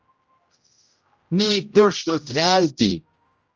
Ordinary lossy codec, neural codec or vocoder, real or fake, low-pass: Opus, 24 kbps; codec, 16 kHz, 0.5 kbps, X-Codec, HuBERT features, trained on general audio; fake; 7.2 kHz